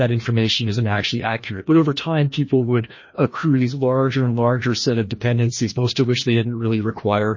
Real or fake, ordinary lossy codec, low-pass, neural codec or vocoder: fake; MP3, 32 kbps; 7.2 kHz; codec, 16 kHz, 1 kbps, FreqCodec, larger model